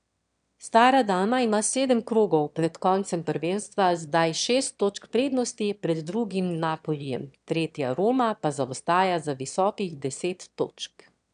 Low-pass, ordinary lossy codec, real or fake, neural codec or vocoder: 9.9 kHz; none; fake; autoencoder, 22.05 kHz, a latent of 192 numbers a frame, VITS, trained on one speaker